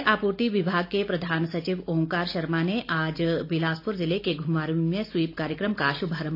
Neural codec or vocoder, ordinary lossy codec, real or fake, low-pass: none; AAC, 32 kbps; real; 5.4 kHz